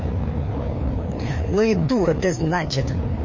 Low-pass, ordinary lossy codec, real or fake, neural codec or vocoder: 7.2 kHz; MP3, 32 kbps; fake; codec, 16 kHz, 2 kbps, FreqCodec, larger model